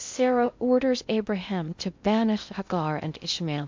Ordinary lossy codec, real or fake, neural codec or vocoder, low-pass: MP3, 64 kbps; fake; codec, 16 kHz in and 24 kHz out, 0.6 kbps, FocalCodec, streaming, 2048 codes; 7.2 kHz